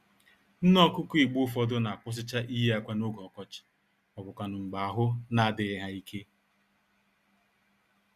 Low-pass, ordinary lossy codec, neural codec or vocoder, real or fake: 14.4 kHz; Opus, 64 kbps; none; real